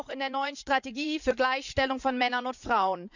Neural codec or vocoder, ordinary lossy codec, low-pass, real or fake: vocoder, 44.1 kHz, 80 mel bands, Vocos; none; 7.2 kHz; fake